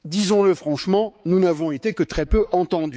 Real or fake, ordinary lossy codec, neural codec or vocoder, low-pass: fake; none; codec, 16 kHz, 4 kbps, X-Codec, HuBERT features, trained on balanced general audio; none